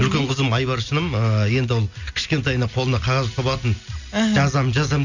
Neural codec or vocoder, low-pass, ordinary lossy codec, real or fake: none; 7.2 kHz; none; real